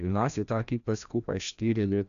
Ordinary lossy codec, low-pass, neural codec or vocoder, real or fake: none; 7.2 kHz; codec, 16 kHz, 1 kbps, FreqCodec, larger model; fake